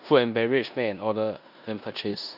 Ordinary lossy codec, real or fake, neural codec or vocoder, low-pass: none; fake; codec, 16 kHz in and 24 kHz out, 0.9 kbps, LongCat-Audio-Codec, four codebook decoder; 5.4 kHz